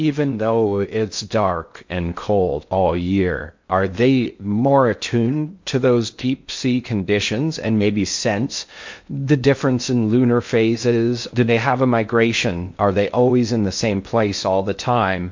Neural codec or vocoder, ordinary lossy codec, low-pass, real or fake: codec, 16 kHz in and 24 kHz out, 0.6 kbps, FocalCodec, streaming, 2048 codes; MP3, 48 kbps; 7.2 kHz; fake